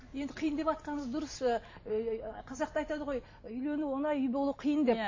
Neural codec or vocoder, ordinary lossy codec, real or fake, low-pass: none; MP3, 32 kbps; real; 7.2 kHz